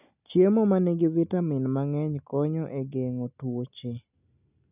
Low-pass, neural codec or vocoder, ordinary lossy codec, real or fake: 3.6 kHz; none; none; real